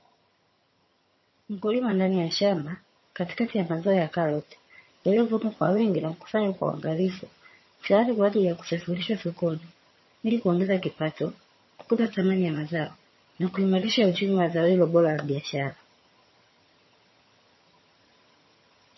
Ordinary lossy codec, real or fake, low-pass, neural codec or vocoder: MP3, 24 kbps; fake; 7.2 kHz; vocoder, 22.05 kHz, 80 mel bands, HiFi-GAN